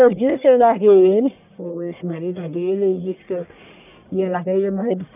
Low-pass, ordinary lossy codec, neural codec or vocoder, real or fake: 3.6 kHz; none; codec, 44.1 kHz, 1.7 kbps, Pupu-Codec; fake